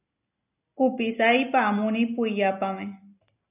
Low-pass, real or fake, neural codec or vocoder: 3.6 kHz; real; none